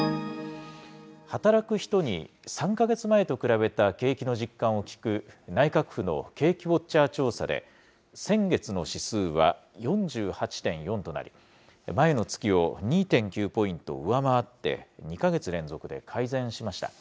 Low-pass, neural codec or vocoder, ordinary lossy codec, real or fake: none; none; none; real